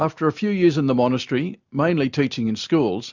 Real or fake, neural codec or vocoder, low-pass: real; none; 7.2 kHz